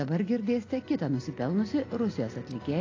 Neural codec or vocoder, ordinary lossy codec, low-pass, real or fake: none; AAC, 32 kbps; 7.2 kHz; real